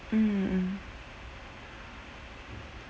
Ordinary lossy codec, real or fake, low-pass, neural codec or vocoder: none; real; none; none